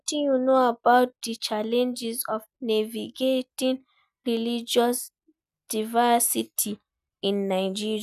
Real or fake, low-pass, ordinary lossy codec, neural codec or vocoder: real; 14.4 kHz; none; none